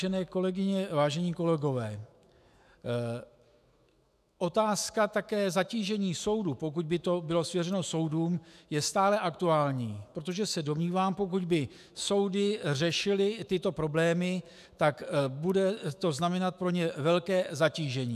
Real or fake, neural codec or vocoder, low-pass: fake; autoencoder, 48 kHz, 128 numbers a frame, DAC-VAE, trained on Japanese speech; 14.4 kHz